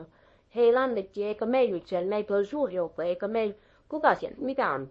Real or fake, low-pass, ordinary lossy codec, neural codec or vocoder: fake; 10.8 kHz; MP3, 32 kbps; codec, 24 kHz, 0.9 kbps, WavTokenizer, small release